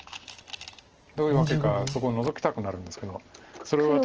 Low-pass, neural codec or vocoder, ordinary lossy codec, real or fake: 7.2 kHz; none; Opus, 16 kbps; real